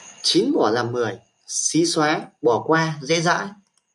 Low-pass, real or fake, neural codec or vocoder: 10.8 kHz; real; none